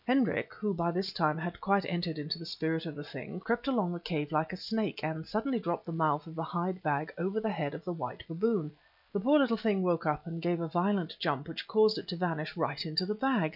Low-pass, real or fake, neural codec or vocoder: 5.4 kHz; real; none